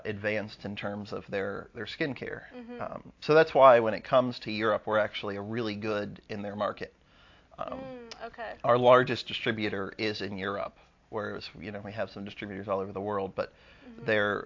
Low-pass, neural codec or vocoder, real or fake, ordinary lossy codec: 7.2 kHz; none; real; AAC, 48 kbps